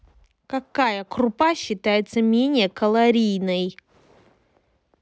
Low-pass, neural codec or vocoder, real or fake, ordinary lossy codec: none; none; real; none